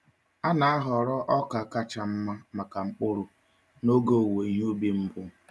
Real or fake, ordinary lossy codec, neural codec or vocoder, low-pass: real; none; none; none